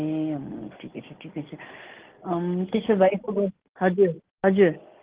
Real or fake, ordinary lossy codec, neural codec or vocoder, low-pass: real; Opus, 16 kbps; none; 3.6 kHz